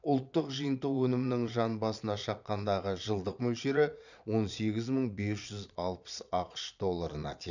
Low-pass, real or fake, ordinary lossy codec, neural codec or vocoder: 7.2 kHz; fake; none; vocoder, 22.05 kHz, 80 mel bands, Vocos